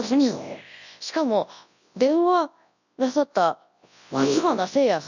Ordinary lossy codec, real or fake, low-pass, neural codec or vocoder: none; fake; 7.2 kHz; codec, 24 kHz, 0.9 kbps, WavTokenizer, large speech release